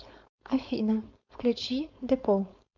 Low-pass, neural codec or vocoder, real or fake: 7.2 kHz; codec, 16 kHz, 4.8 kbps, FACodec; fake